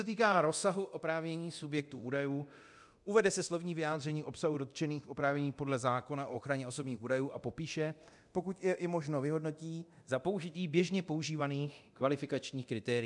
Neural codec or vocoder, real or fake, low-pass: codec, 24 kHz, 0.9 kbps, DualCodec; fake; 10.8 kHz